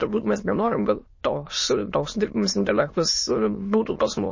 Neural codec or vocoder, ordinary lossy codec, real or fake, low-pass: autoencoder, 22.05 kHz, a latent of 192 numbers a frame, VITS, trained on many speakers; MP3, 32 kbps; fake; 7.2 kHz